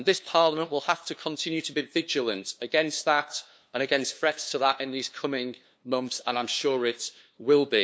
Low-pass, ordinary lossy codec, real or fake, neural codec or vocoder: none; none; fake; codec, 16 kHz, 2 kbps, FunCodec, trained on LibriTTS, 25 frames a second